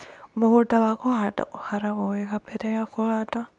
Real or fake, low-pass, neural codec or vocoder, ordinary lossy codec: fake; 10.8 kHz; codec, 24 kHz, 0.9 kbps, WavTokenizer, medium speech release version 2; none